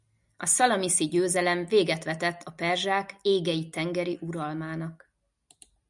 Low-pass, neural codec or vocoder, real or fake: 10.8 kHz; none; real